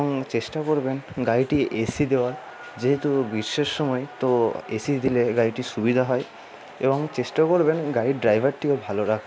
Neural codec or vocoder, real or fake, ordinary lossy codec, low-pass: none; real; none; none